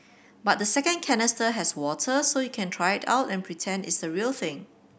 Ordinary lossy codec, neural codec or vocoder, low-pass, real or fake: none; none; none; real